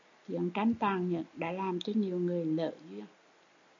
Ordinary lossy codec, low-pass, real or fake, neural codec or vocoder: MP3, 64 kbps; 7.2 kHz; real; none